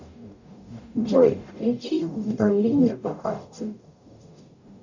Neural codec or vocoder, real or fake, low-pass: codec, 44.1 kHz, 0.9 kbps, DAC; fake; 7.2 kHz